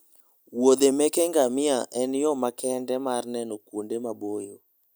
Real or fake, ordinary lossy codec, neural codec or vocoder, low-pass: real; none; none; none